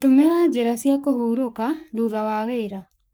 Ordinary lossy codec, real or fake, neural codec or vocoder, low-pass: none; fake; codec, 44.1 kHz, 3.4 kbps, Pupu-Codec; none